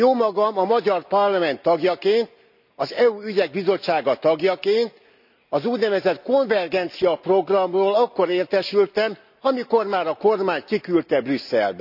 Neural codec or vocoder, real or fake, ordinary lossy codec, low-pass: none; real; none; 5.4 kHz